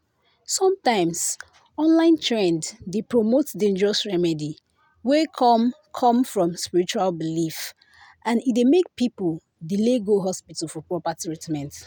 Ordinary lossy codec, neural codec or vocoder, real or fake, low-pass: none; none; real; none